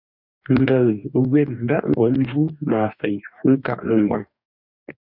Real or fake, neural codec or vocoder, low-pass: fake; codec, 44.1 kHz, 2.6 kbps, DAC; 5.4 kHz